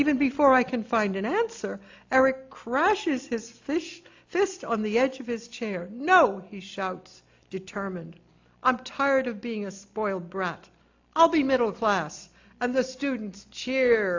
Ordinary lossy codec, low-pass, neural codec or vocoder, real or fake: AAC, 48 kbps; 7.2 kHz; none; real